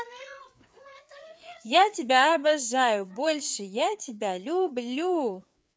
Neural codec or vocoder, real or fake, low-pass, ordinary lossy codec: codec, 16 kHz, 4 kbps, FreqCodec, larger model; fake; none; none